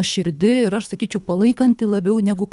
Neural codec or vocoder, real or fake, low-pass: codec, 24 kHz, 3 kbps, HILCodec; fake; 10.8 kHz